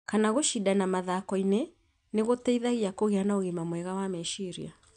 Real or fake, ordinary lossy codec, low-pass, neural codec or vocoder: real; none; 9.9 kHz; none